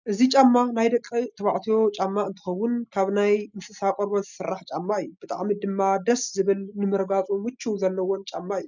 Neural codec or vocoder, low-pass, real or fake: none; 7.2 kHz; real